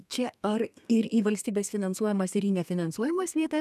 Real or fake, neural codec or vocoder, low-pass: fake; codec, 32 kHz, 1.9 kbps, SNAC; 14.4 kHz